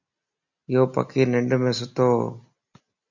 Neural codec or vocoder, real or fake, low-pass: none; real; 7.2 kHz